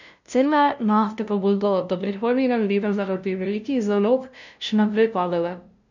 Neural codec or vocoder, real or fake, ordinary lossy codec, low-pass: codec, 16 kHz, 0.5 kbps, FunCodec, trained on LibriTTS, 25 frames a second; fake; none; 7.2 kHz